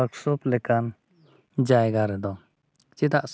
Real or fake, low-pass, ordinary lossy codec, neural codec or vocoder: real; none; none; none